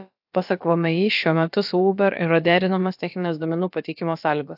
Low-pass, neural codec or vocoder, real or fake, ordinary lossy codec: 5.4 kHz; codec, 16 kHz, about 1 kbps, DyCAST, with the encoder's durations; fake; AAC, 48 kbps